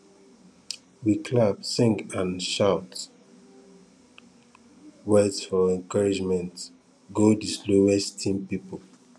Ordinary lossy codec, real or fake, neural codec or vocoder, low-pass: none; real; none; none